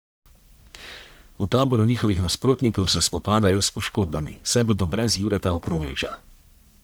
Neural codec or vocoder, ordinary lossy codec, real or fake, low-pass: codec, 44.1 kHz, 1.7 kbps, Pupu-Codec; none; fake; none